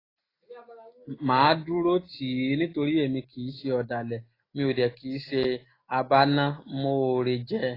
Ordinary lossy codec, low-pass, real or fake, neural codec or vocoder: AAC, 24 kbps; 5.4 kHz; real; none